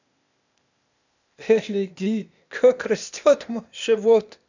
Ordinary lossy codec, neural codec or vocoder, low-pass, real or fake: none; codec, 16 kHz, 0.8 kbps, ZipCodec; 7.2 kHz; fake